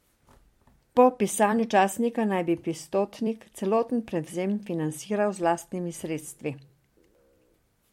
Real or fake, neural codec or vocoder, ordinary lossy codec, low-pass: fake; vocoder, 44.1 kHz, 128 mel bands every 256 samples, BigVGAN v2; MP3, 64 kbps; 19.8 kHz